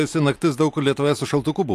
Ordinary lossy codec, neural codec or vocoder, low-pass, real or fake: AAC, 64 kbps; none; 14.4 kHz; real